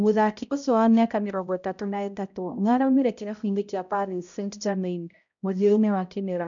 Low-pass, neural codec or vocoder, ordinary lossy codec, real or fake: 7.2 kHz; codec, 16 kHz, 0.5 kbps, X-Codec, HuBERT features, trained on balanced general audio; none; fake